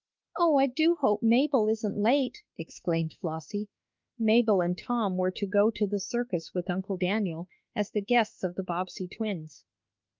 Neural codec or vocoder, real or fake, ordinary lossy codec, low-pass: autoencoder, 48 kHz, 32 numbers a frame, DAC-VAE, trained on Japanese speech; fake; Opus, 24 kbps; 7.2 kHz